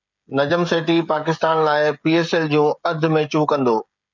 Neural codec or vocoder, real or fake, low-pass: codec, 16 kHz, 16 kbps, FreqCodec, smaller model; fake; 7.2 kHz